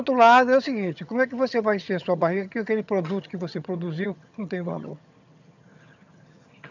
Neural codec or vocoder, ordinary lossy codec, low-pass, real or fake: vocoder, 22.05 kHz, 80 mel bands, HiFi-GAN; none; 7.2 kHz; fake